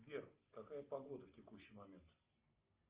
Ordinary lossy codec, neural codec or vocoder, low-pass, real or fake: Opus, 24 kbps; none; 3.6 kHz; real